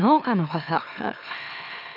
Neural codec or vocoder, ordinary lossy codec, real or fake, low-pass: autoencoder, 44.1 kHz, a latent of 192 numbers a frame, MeloTTS; none; fake; 5.4 kHz